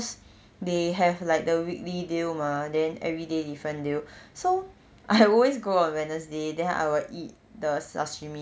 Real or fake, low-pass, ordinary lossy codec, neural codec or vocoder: real; none; none; none